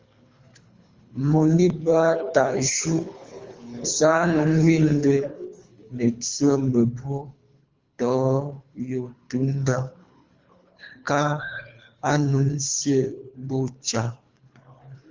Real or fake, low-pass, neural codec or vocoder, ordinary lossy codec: fake; 7.2 kHz; codec, 24 kHz, 3 kbps, HILCodec; Opus, 32 kbps